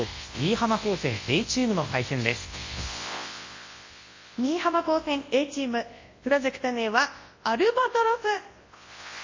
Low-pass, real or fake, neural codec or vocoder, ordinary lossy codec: 7.2 kHz; fake; codec, 24 kHz, 0.9 kbps, WavTokenizer, large speech release; MP3, 32 kbps